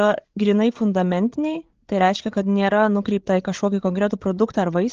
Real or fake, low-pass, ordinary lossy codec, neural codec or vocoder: fake; 7.2 kHz; Opus, 16 kbps; codec, 16 kHz, 8 kbps, FreqCodec, larger model